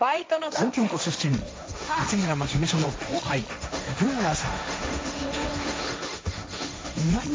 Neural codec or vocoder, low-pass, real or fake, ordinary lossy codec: codec, 16 kHz, 1.1 kbps, Voila-Tokenizer; none; fake; none